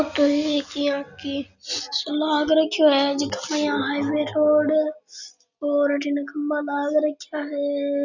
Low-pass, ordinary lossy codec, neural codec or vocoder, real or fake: 7.2 kHz; none; none; real